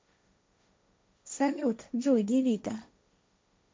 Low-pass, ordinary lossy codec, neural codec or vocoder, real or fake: none; none; codec, 16 kHz, 1.1 kbps, Voila-Tokenizer; fake